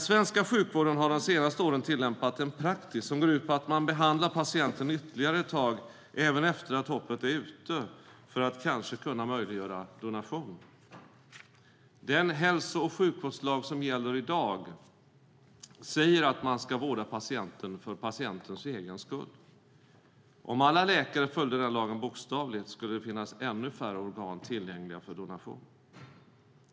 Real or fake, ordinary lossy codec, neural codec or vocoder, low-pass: real; none; none; none